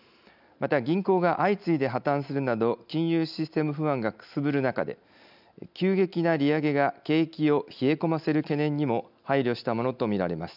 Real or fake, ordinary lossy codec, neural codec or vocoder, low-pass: real; none; none; 5.4 kHz